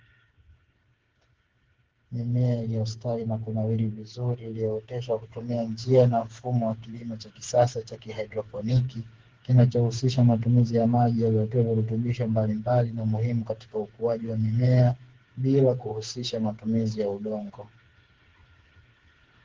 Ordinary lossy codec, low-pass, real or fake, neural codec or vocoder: Opus, 16 kbps; 7.2 kHz; fake; codec, 16 kHz, 4 kbps, FreqCodec, smaller model